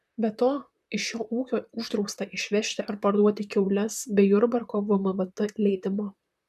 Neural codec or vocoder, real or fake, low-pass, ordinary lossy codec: vocoder, 44.1 kHz, 128 mel bands, Pupu-Vocoder; fake; 14.4 kHz; MP3, 96 kbps